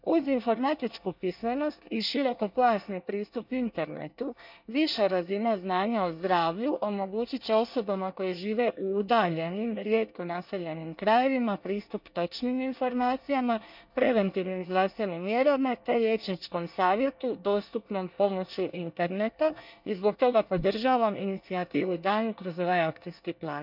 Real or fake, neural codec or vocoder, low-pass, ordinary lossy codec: fake; codec, 24 kHz, 1 kbps, SNAC; 5.4 kHz; none